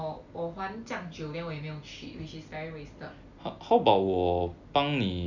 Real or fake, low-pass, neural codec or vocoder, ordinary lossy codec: real; 7.2 kHz; none; none